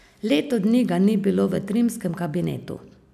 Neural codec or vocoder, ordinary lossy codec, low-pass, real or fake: none; none; 14.4 kHz; real